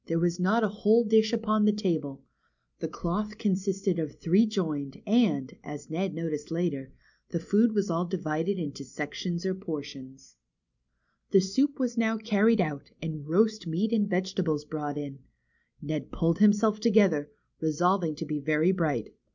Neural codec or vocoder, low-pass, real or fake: none; 7.2 kHz; real